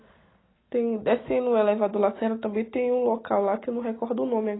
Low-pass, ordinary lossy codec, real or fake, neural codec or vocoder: 7.2 kHz; AAC, 16 kbps; real; none